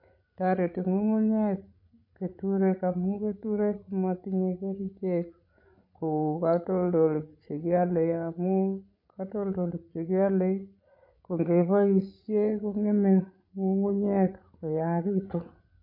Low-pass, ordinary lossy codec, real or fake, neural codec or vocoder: 5.4 kHz; MP3, 48 kbps; fake; codec, 16 kHz, 16 kbps, FreqCodec, larger model